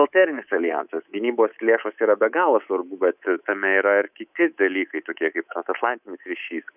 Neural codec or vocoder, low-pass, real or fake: codec, 24 kHz, 3.1 kbps, DualCodec; 3.6 kHz; fake